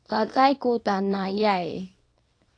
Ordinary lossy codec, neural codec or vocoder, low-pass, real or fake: AAC, 48 kbps; codec, 24 kHz, 0.9 kbps, WavTokenizer, small release; 9.9 kHz; fake